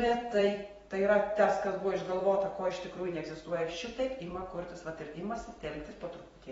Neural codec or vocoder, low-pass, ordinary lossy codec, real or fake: vocoder, 44.1 kHz, 128 mel bands every 256 samples, BigVGAN v2; 19.8 kHz; AAC, 24 kbps; fake